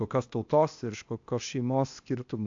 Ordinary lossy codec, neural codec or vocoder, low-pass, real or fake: AAC, 64 kbps; codec, 16 kHz, 0.8 kbps, ZipCodec; 7.2 kHz; fake